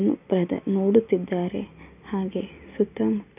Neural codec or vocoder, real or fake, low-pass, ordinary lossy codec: none; real; 3.6 kHz; none